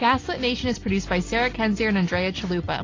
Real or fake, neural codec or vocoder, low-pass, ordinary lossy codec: real; none; 7.2 kHz; AAC, 32 kbps